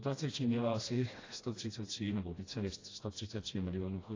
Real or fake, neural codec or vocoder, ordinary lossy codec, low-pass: fake; codec, 16 kHz, 1 kbps, FreqCodec, smaller model; AAC, 32 kbps; 7.2 kHz